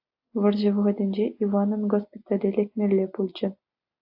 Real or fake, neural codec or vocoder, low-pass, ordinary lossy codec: real; none; 5.4 kHz; AAC, 48 kbps